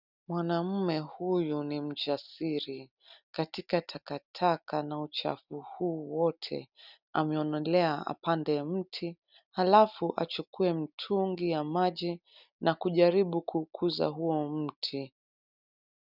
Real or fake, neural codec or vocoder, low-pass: real; none; 5.4 kHz